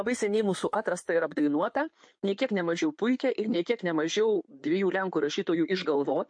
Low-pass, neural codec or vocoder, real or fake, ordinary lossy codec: 9.9 kHz; codec, 16 kHz in and 24 kHz out, 2.2 kbps, FireRedTTS-2 codec; fake; MP3, 48 kbps